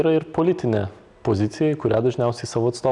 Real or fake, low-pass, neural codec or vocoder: real; 10.8 kHz; none